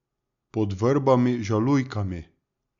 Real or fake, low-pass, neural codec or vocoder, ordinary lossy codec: real; 7.2 kHz; none; Opus, 64 kbps